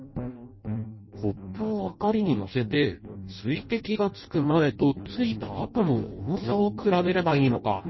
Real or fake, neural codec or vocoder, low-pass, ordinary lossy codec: fake; codec, 16 kHz in and 24 kHz out, 0.6 kbps, FireRedTTS-2 codec; 7.2 kHz; MP3, 24 kbps